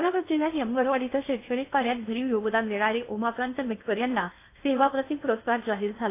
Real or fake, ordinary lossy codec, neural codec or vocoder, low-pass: fake; AAC, 24 kbps; codec, 16 kHz in and 24 kHz out, 0.6 kbps, FocalCodec, streaming, 2048 codes; 3.6 kHz